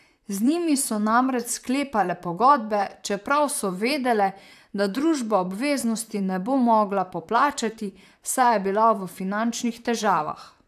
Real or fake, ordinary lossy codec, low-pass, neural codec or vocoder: fake; AAC, 96 kbps; 14.4 kHz; vocoder, 44.1 kHz, 128 mel bands, Pupu-Vocoder